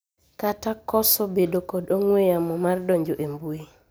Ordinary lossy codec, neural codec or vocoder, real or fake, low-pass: none; none; real; none